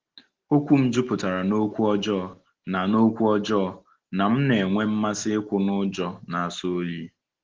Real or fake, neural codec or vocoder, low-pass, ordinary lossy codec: real; none; 7.2 kHz; Opus, 16 kbps